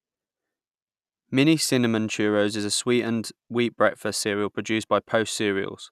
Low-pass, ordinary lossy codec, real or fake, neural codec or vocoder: 14.4 kHz; none; real; none